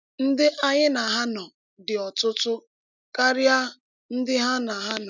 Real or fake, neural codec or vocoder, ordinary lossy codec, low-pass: real; none; none; 7.2 kHz